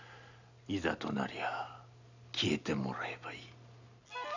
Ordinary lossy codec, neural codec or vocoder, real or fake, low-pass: none; none; real; 7.2 kHz